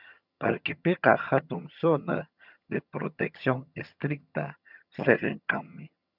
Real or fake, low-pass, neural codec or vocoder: fake; 5.4 kHz; vocoder, 22.05 kHz, 80 mel bands, HiFi-GAN